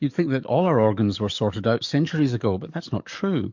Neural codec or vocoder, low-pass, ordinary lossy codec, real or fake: codec, 44.1 kHz, 7.8 kbps, DAC; 7.2 kHz; MP3, 64 kbps; fake